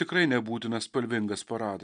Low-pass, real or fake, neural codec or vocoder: 9.9 kHz; real; none